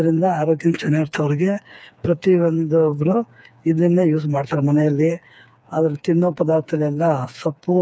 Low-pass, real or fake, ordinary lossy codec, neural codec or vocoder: none; fake; none; codec, 16 kHz, 4 kbps, FreqCodec, smaller model